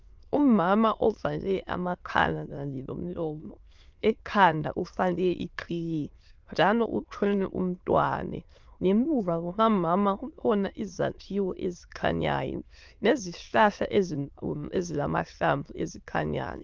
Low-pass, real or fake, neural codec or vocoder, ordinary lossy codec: 7.2 kHz; fake; autoencoder, 22.05 kHz, a latent of 192 numbers a frame, VITS, trained on many speakers; Opus, 24 kbps